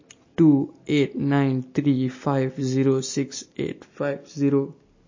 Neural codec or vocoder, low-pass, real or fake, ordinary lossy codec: none; 7.2 kHz; real; MP3, 32 kbps